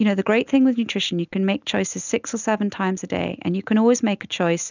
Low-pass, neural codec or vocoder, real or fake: 7.2 kHz; none; real